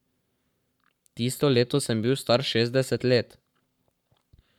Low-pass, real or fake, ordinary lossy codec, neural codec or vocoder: 19.8 kHz; fake; none; codec, 44.1 kHz, 7.8 kbps, Pupu-Codec